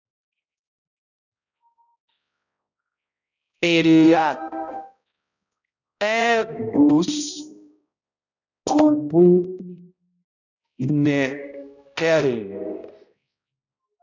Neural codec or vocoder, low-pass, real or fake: codec, 16 kHz, 0.5 kbps, X-Codec, HuBERT features, trained on balanced general audio; 7.2 kHz; fake